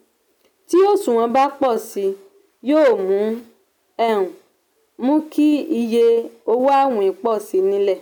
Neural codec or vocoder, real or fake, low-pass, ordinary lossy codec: none; real; 19.8 kHz; none